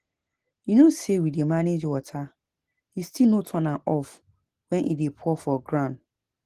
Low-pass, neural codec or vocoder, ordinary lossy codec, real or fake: 14.4 kHz; none; Opus, 16 kbps; real